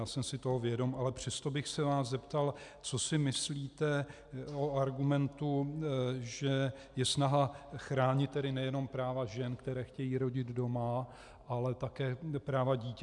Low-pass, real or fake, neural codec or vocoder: 10.8 kHz; real; none